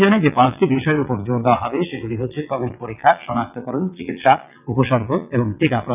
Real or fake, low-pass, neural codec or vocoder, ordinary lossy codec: fake; 3.6 kHz; vocoder, 22.05 kHz, 80 mel bands, WaveNeXt; none